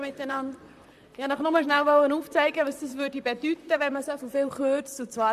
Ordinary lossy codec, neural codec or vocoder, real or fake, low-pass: MP3, 96 kbps; vocoder, 44.1 kHz, 128 mel bands, Pupu-Vocoder; fake; 14.4 kHz